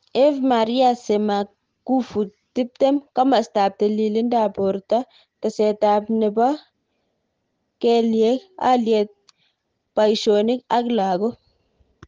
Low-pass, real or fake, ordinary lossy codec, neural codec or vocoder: 7.2 kHz; real; Opus, 16 kbps; none